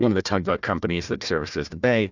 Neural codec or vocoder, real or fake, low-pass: codec, 16 kHz, 1 kbps, FunCodec, trained on Chinese and English, 50 frames a second; fake; 7.2 kHz